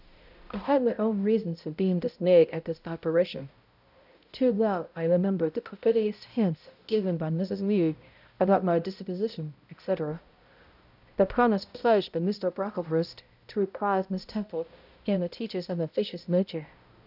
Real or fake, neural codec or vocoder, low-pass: fake; codec, 16 kHz, 0.5 kbps, X-Codec, HuBERT features, trained on balanced general audio; 5.4 kHz